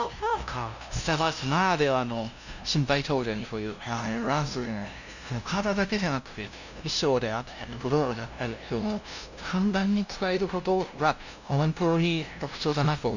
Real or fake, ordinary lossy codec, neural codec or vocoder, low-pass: fake; none; codec, 16 kHz, 0.5 kbps, FunCodec, trained on LibriTTS, 25 frames a second; 7.2 kHz